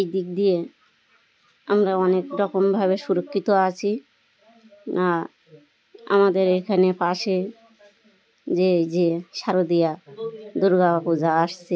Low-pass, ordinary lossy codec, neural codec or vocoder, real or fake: none; none; none; real